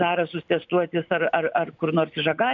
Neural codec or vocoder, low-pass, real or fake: none; 7.2 kHz; real